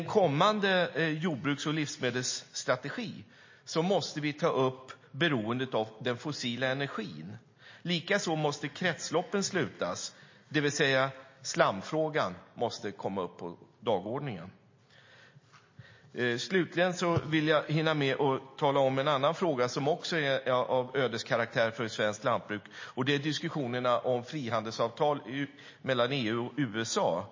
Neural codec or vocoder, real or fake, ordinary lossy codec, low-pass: none; real; MP3, 32 kbps; 7.2 kHz